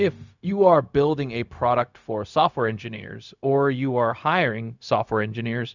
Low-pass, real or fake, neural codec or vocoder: 7.2 kHz; fake; codec, 16 kHz, 0.4 kbps, LongCat-Audio-Codec